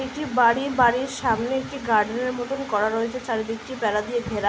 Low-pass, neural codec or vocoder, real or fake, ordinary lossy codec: none; none; real; none